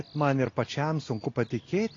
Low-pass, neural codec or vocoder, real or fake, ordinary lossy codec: 7.2 kHz; none; real; AAC, 32 kbps